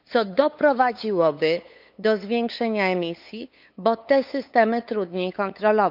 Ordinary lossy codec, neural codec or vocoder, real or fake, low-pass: none; codec, 16 kHz, 8 kbps, FunCodec, trained on LibriTTS, 25 frames a second; fake; 5.4 kHz